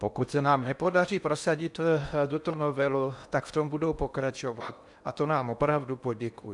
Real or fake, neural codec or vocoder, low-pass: fake; codec, 16 kHz in and 24 kHz out, 0.8 kbps, FocalCodec, streaming, 65536 codes; 10.8 kHz